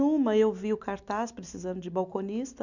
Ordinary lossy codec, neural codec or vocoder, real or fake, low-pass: none; none; real; 7.2 kHz